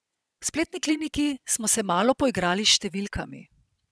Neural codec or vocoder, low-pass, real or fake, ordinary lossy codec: vocoder, 22.05 kHz, 80 mel bands, WaveNeXt; none; fake; none